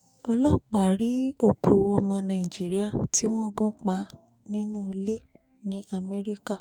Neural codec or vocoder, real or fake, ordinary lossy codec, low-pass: codec, 44.1 kHz, 2.6 kbps, DAC; fake; none; 19.8 kHz